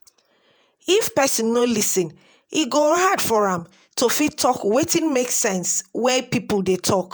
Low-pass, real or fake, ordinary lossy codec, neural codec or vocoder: none; fake; none; vocoder, 48 kHz, 128 mel bands, Vocos